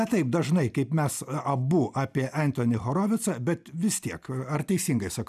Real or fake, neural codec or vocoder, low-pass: real; none; 14.4 kHz